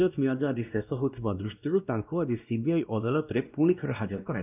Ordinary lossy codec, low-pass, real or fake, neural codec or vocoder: none; 3.6 kHz; fake; codec, 16 kHz, 1 kbps, X-Codec, WavLM features, trained on Multilingual LibriSpeech